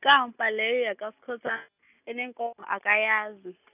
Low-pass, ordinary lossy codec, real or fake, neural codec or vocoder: 3.6 kHz; none; real; none